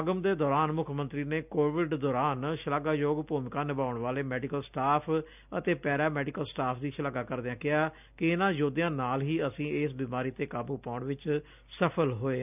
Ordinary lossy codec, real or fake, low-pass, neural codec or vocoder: none; real; 3.6 kHz; none